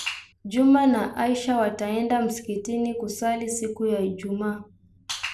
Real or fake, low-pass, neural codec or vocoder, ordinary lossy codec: real; none; none; none